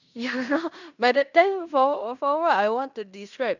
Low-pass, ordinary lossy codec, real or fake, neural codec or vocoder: 7.2 kHz; none; fake; codec, 16 kHz in and 24 kHz out, 0.9 kbps, LongCat-Audio-Codec, fine tuned four codebook decoder